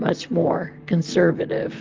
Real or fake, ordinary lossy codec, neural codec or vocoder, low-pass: fake; Opus, 32 kbps; vocoder, 44.1 kHz, 128 mel bands, Pupu-Vocoder; 7.2 kHz